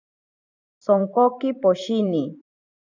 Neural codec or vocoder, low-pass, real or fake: vocoder, 44.1 kHz, 128 mel bands every 512 samples, BigVGAN v2; 7.2 kHz; fake